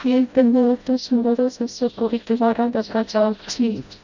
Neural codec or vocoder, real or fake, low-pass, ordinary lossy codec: codec, 16 kHz, 0.5 kbps, FreqCodec, smaller model; fake; 7.2 kHz; none